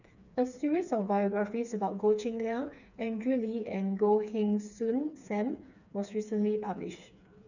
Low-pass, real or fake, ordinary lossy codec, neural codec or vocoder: 7.2 kHz; fake; none; codec, 16 kHz, 4 kbps, FreqCodec, smaller model